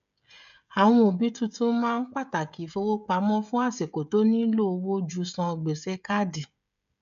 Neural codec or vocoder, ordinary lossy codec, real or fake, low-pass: codec, 16 kHz, 16 kbps, FreqCodec, smaller model; AAC, 96 kbps; fake; 7.2 kHz